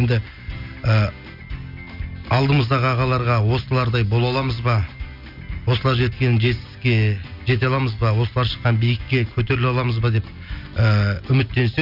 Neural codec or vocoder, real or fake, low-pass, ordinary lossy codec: none; real; 5.4 kHz; none